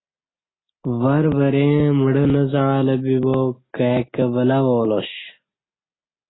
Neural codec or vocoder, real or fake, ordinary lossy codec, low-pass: none; real; AAC, 16 kbps; 7.2 kHz